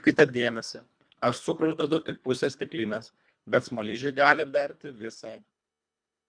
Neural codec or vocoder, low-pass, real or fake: codec, 24 kHz, 1.5 kbps, HILCodec; 9.9 kHz; fake